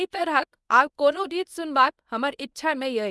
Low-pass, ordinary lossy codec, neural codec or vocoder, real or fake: none; none; codec, 24 kHz, 0.9 kbps, WavTokenizer, medium speech release version 1; fake